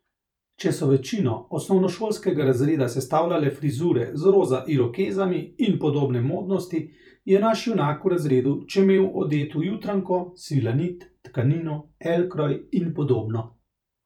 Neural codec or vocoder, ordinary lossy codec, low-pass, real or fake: vocoder, 44.1 kHz, 128 mel bands every 512 samples, BigVGAN v2; none; 19.8 kHz; fake